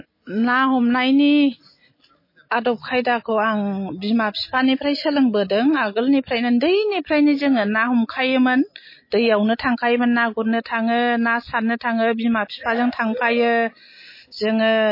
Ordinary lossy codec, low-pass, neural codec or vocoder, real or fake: MP3, 24 kbps; 5.4 kHz; none; real